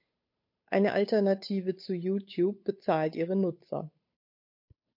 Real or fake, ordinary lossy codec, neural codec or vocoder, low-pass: fake; MP3, 32 kbps; codec, 16 kHz, 8 kbps, FunCodec, trained on Chinese and English, 25 frames a second; 5.4 kHz